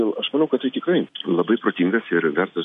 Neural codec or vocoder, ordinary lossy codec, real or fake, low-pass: none; MP3, 48 kbps; real; 14.4 kHz